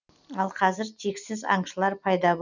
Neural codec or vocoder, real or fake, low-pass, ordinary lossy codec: none; real; 7.2 kHz; none